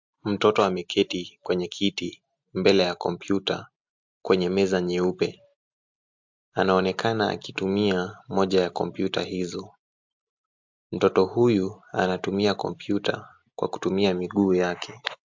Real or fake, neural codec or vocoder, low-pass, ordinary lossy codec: real; none; 7.2 kHz; MP3, 64 kbps